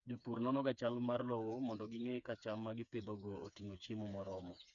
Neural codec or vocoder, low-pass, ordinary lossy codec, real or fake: codec, 16 kHz, 4 kbps, FreqCodec, smaller model; 7.2 kHz; none; fake